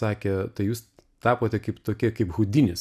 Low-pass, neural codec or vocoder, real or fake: 14.4 kHz; none; real